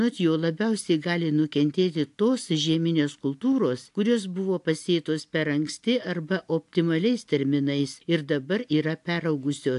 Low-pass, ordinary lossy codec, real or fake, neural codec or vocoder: 10.8 kHz; AAC, 64 kbps; real; none